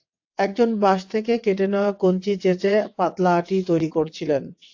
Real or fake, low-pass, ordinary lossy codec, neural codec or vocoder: fake; 7.2 kHz; AAC, 48 kbps; vocoder, 22.05 kHz, 80 mel bands, WaveNeXt